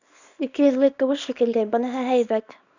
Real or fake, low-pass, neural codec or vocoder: fake; 7.2 kHz; codec, 24 kHz, 0.9 kbps, WavTokenizer, small release